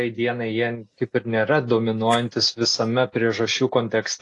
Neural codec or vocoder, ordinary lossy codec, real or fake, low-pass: none; AAC, 48 kbps; real; 10.8 kHz